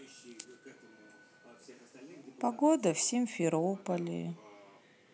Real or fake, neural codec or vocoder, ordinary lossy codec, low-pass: real; none; none; none